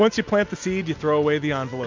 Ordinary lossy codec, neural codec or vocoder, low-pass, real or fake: MP3, 64 kbps; none; 7.2 kHz; real